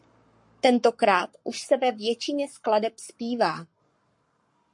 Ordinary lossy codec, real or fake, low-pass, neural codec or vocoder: MP3, 48 kbps; fake; 10.8 kHz; codec, 44.1 kHz, 7.8 kbps, Pupu-Codec